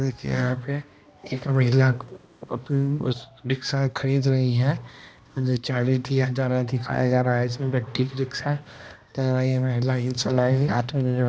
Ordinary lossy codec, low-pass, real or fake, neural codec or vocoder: none; none; fake; codec, 16 kHz, 1 kbps, X-Codec, HuBERT features, trained on balanced general audio